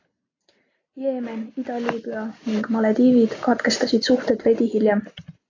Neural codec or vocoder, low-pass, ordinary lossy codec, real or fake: none; 7.2 kHz; AAC, 32 kbps; real